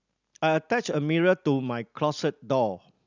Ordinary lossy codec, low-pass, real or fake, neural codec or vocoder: none; 7.2 kHz; real; none